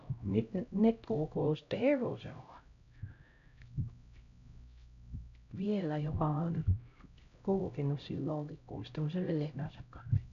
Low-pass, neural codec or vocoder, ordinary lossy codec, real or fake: 7.2 kHz; codec, 16 kHz, 0.5 kbps, X-Codec, HuBERT features, trained on LibriSpeech; none; fake